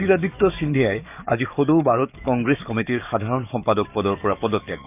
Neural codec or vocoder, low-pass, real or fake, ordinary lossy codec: codec, 44.1 kHz, 7.8 kbps, DAC; 3.6 kHz; fake; none